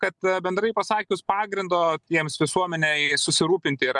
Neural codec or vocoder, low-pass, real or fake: none; 10.8 kHz; real